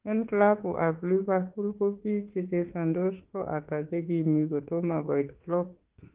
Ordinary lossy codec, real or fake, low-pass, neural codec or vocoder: Opus, 16 kbps; fake; 3.6 kHz; autoencoder, 48 kHz, 32 numbers a frame, DAC-VAE, trained on Japanese speech